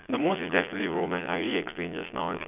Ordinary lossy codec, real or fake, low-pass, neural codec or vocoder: none; fake; 3.6 kHz; vocoder, 22.05 kHz, 80 mel bands, Vocos